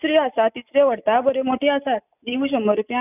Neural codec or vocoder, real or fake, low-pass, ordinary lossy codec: none; real; 3.6 kHz; none